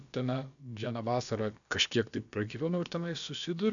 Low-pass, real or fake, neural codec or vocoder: 7.2 kHz; fake; codec, 16 kHz, about 1 kbps, DyCAST, with the encoder's durations